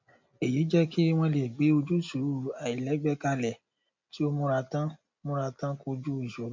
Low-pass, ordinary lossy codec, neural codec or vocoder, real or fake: 7.2 kHz; MP3, 64 kbps; none; real